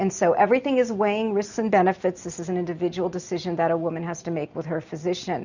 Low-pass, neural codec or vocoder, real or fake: 7.2 kHz; none; real